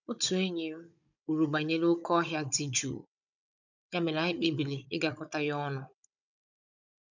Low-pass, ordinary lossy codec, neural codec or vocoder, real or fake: 7.2 kHz; none; codec, 16 kHz, 16 kbps, FunCodec, trained on Chinese and English, 50 frames a second; fake